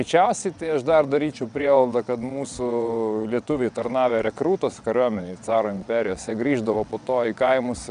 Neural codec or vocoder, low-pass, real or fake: vocoder, 22.05 kHz, 80 mel bands, WaveNeXt; 9.9 kHz; fake